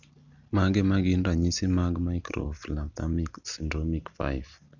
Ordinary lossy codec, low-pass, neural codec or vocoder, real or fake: none; 7.2 kHz; none; real